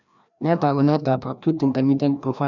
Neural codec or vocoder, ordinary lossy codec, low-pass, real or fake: codec, 16 kHz, 1 kbps, FreqCodec, larger model; none; 7.2 kHz; fake